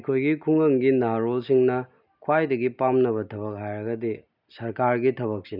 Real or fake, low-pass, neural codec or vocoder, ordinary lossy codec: real; 5.4 kHz; none; none